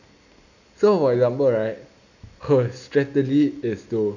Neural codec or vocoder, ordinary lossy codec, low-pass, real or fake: none; none; 7.2 kHz; real